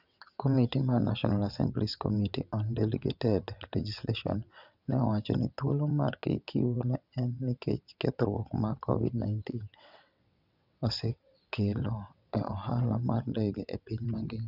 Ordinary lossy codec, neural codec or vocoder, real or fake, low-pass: none; vocoder, 22.05 kHz, 80 mel bands, WaveNeXt; fake; 5.4 kHz